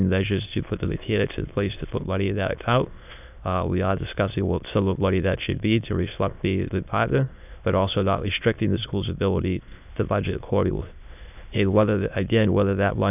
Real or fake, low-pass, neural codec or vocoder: fake; 3.6 kHz; autoencoder, 22.05 kHz, a latent of 192 numbers a frame, VITS, trained on many speakers